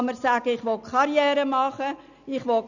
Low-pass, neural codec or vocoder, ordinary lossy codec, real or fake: 7.2 kHz; none; none; real